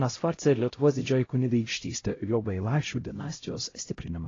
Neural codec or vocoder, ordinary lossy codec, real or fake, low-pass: codec, 16 kHz, 0.5 kbps, X-Codec, HuBERT features, trained on LibriSpeech; AAC, 32 kbps; fake; 7.2 kHz